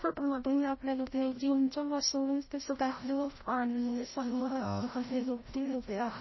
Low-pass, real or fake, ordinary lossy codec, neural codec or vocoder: 7.2 kHz; fake; MP3, 24 kbps; codec, 16 kHz, 0.5 kbps, FreqCodec, larger model